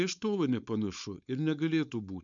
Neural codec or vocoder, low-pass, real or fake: codec, 16 kHz, 4.8 kbps, FACodec; 7.2 kHz; fake